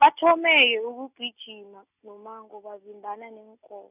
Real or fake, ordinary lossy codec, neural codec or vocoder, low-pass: real; none; none; 3.6 kHz